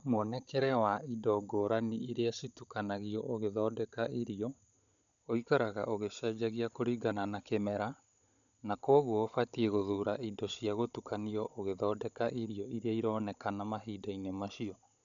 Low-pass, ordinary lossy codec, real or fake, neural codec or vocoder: 7.2 kHz; none; fake; codec, 16 kHz, 16 kbps, FunCodec, trained on Chinese and English, 50 frames a second